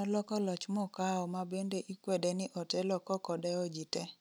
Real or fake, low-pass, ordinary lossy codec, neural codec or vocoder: real; none; none; none